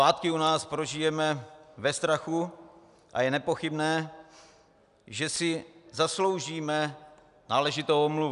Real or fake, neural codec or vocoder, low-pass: real; none; 10.8 kHz